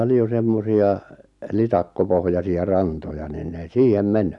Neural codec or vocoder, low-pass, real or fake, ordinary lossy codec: none; 10.8 kHz; real; none